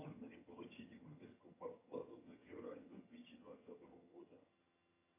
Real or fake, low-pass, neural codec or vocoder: fake; 3.6 kHz; vocoder, 22.05 kHz, 80 mel bands, HiFi-GAN